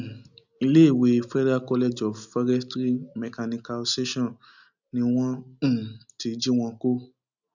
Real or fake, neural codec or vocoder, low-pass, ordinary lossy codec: real; none; 7.2 kHz; none